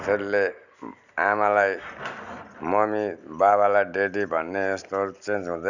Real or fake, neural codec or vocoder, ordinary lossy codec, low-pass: real; none; none; 7.2 kHz